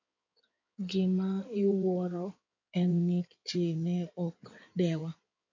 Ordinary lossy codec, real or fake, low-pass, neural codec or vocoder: MP3, 64 kbps; fake; 7.2 kHz; codec, 16 kHz in and 24 kHz out, 2.2 kbps, FireRedTTS-2 codec